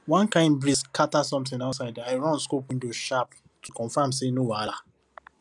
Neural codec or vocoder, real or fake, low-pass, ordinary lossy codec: none; real; 10.8 kHz; none